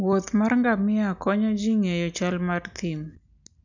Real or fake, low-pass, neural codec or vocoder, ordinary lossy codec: real; 7.2 kHz; none; none